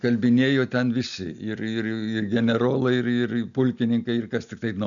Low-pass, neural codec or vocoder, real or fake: 7.2 kHz; none; real